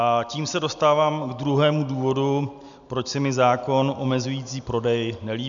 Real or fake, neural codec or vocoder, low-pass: real; none; 7.2 kHz